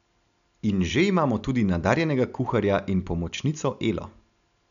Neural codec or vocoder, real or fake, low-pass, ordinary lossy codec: none; real; 7.2 kHz; none